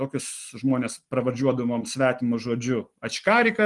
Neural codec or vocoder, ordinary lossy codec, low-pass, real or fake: none; Opus, 24 kbps; 10.8 kHz; real